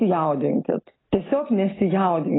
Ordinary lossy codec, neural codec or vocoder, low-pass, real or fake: AAC, 16 kbps; none; 7.2 kHz; real